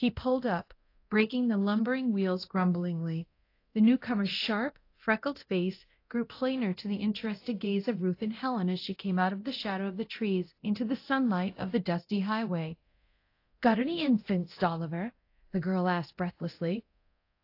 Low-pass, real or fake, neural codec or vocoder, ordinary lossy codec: 5.4 kHz; fake; codec, 24 kHz, 0.9 kbps, DualCodec; AAC, 32 kbps